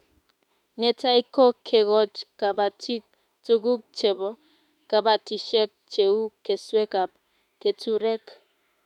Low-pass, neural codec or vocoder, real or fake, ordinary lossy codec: 19.8 kHz; autoencoder, 48 kHz, 32 numbers a frame, DAC-VAE, trained on Japanese speech; fake; MP3, 96 kbps